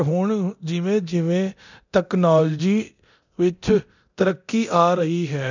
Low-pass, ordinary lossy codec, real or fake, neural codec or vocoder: 7.2 kHz; none; fake; codec, 24 kHz, 0.9 kbps, DualCodec